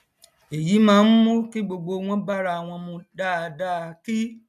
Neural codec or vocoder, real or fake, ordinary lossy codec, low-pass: none; real; none; 14.4 kHz